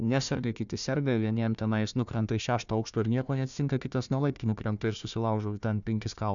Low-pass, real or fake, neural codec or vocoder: 7.2 kHz; fake; codec, 16 kHz, 1 kbps, FunCodec, trained on Chinese and English, 50 frames a second